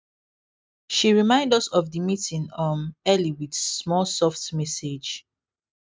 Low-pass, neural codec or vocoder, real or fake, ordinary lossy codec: none; none; real; none